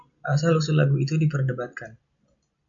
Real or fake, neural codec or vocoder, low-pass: real; none; 7.2 kHz